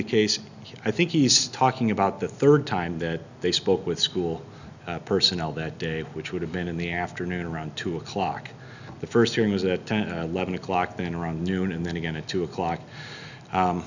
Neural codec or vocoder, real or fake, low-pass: none; real; 7.2 kHz